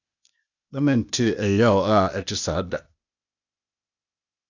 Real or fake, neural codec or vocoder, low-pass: fake; codec, 16 kHz, 0.8 kbps, ZipCodec; 7.2 kHz